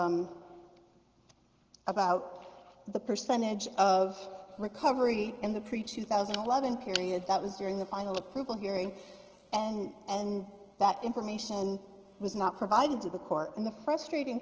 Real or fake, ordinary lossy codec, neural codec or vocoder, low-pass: real; Opus, 16 kbps; none; 7.2 kHz